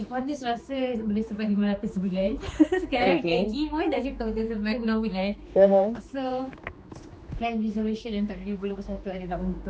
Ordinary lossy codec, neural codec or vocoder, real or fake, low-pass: none; codec, 16 kHz, 2 kbps, X-Codec, HuBERT features, trained on general audio; fake; none